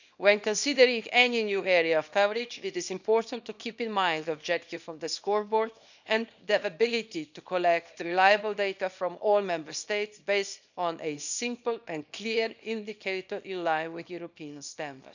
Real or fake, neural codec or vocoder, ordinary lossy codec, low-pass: fake; codec, 24 kHz, 0.9 kbps, WavTokenizer, small release; none; 7.2 kHz